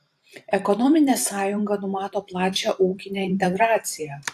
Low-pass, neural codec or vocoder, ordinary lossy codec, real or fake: 14.4 kHz; vocoder, 44.1 kHz, 128 mel bands every 512 samples, BigVGAN v2; AAC, 64 kbps; fake